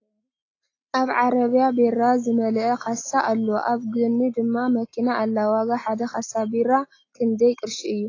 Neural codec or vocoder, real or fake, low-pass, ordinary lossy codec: none; real; 7.2 kHz; AAC, 32 kbps